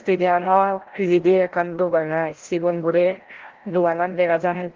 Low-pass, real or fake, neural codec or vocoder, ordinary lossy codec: 7.2 kHz; fake; codec, 16 kHz, 0.5 kbps, FreqCodec, larger model; Opus, 16 kbps